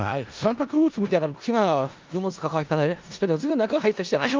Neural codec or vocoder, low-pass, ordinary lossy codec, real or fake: codec, 16 kHz in and 24 kHz out, 0.4 kbps, LongCat-Audio-Codec, four codebook decoder; 7.2 kHz; Opus, 32 kbps; fake